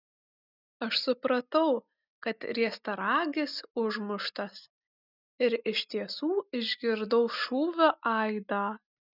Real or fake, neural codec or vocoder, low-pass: real; none; 5.4 kHz